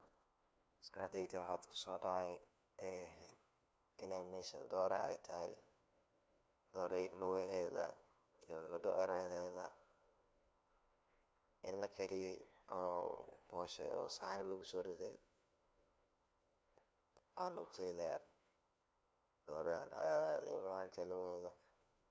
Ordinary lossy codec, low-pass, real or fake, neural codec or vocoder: none; none; fake; codec, 16 kHz, 1 kbps, FunCodec, trained on LibriTTS, 50 frames a second